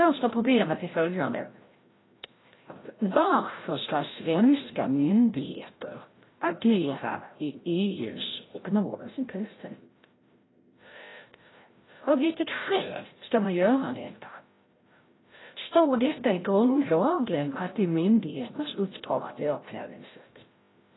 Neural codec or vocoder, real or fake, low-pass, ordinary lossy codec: codec, 16 kHz, 0.5 kbps, FreqCodec, larger model; fake; 7.2 kHz; AAC, 16 kbps